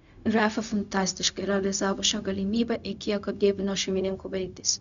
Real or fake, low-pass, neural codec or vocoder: fake; 7.2 kHz; codec, 16 kHz, 0.4 kbps, LongCat-Audio-Codec